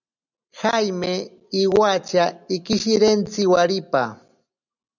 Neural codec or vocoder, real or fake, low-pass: none; real; 7.2 kHz